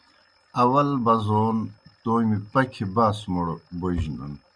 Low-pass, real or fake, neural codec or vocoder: 9.9 kHz; real; none